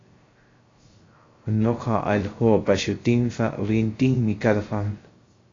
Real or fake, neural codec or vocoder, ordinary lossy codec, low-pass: fake; codec, 16 kHz, 0.3 kbps, FocalCodec; AAC, 32 kbps; 7.2 kHz